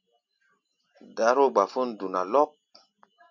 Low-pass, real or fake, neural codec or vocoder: 7.2 kHz; real; none